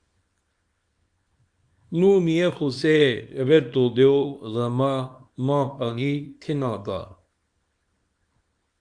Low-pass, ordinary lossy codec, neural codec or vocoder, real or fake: 9.9 kHz; Opus, 64 kbps; codec, 24 kHz, 0.9 kbps, WavTokenizer, small release; fake